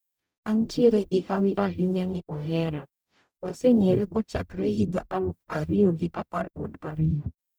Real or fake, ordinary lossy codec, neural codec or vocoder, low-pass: fake; none; codec, 44.1 kHz, 0.9 kbps, DAC; none